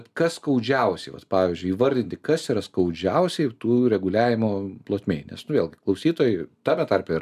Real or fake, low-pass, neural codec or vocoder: real; 14.4 kHz; none